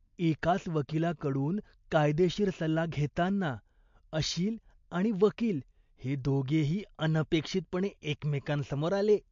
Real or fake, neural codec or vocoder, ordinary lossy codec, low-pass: real; none; MP3, 48 kbps; 7.2 kHz